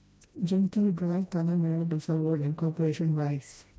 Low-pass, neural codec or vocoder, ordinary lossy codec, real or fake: none; codec, 16 kHz, 1 kbps, FreqCodec, smaller model; none; fake